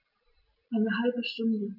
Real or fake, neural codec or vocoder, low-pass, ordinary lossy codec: real; none; 5.4 kHz; none